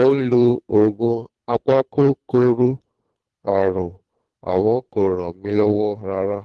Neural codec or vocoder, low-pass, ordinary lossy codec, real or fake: codec, 24 kHz, 3 kbps, HILCodec; 10.8 kHz; Opus, 16 kbps; fake